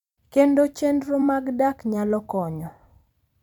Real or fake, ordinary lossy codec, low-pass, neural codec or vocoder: fake; none; 19.8 kHz; vocoder, 44.1 kHz, 128 mel bands every 256 samples, BigVGAN v2